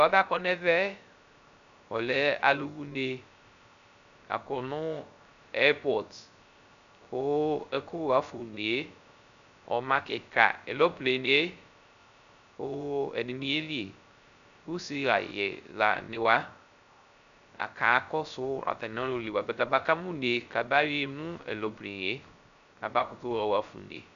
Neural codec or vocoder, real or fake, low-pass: codec, 16 kHz, 0.3 kbps, FocalCodec; fake; 7.2 kHz